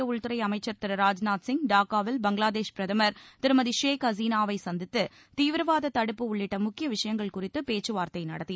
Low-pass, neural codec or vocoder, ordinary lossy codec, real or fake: none; none; none; real